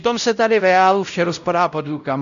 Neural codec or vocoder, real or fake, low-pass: codec, 16 kHz, 0.5 kbps, X-Codec, WavLM features, trained on Multilingual LibriSpeech; fake; 7.2 kHz